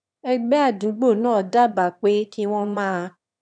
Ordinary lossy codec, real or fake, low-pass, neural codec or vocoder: none; fake; 9.9 kHz; autoencoder, 22.05 kHz, a latent of 192 numbers a frame, VITS, trained on one speaker